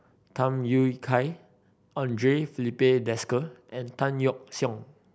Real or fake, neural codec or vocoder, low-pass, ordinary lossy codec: real; none; none; none